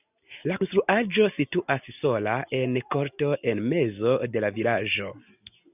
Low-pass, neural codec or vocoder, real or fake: 3.6 kHz; none; real